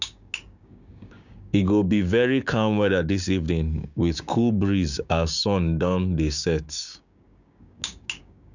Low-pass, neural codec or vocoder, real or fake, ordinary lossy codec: 7.2 kHz; codec, 16 kHz, 6 kbps, DAC; fake; none